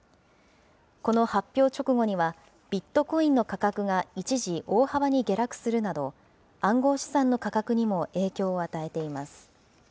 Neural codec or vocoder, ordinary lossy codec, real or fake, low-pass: none; none; real; none